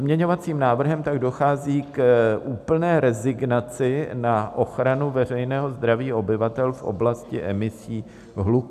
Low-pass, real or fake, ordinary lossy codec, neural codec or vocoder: 14.4 kHz; real; AAC, 96 kbps; none